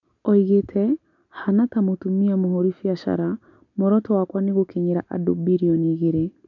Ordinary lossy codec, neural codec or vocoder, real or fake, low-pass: none; none; real; 7.2 kHz